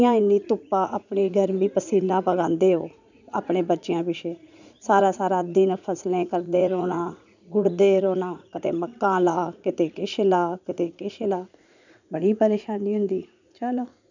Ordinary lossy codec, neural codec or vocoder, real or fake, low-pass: none; vocoder, 44.1 kHz, 80 mel bands, Vocos; fake; 7.2 kHz